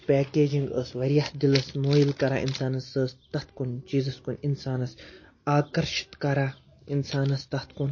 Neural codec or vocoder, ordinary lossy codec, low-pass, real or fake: none; MP3, 32 kbps; 7.2 kHz; real